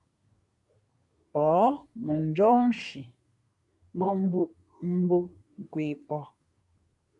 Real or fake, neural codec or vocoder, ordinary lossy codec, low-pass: fake; codec, 24 kHz, 1 kbps, SNAC; MP3, 64 kbps; 10.8 kHz